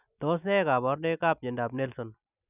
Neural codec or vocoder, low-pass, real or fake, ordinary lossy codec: none; 3.6 kHz; real; none